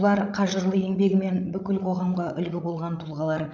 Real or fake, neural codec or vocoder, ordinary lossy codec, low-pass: fake; codec, 16 kHz, 8 kbps, FreqCodec, larger model; none; none